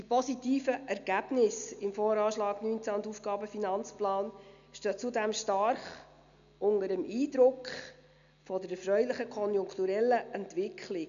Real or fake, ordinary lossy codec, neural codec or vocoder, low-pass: real; MP3, 96 kbps; none; 7.2 kHz